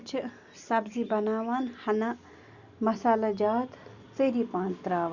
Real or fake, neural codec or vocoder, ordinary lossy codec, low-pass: real; none; Opus, 64 kbps; 7.2 kHz